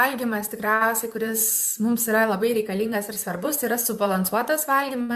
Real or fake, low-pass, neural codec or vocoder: fake; 14.4 kHz; vocoder, 44.1 kHz, 128 mel bands, Pupu-Vocoder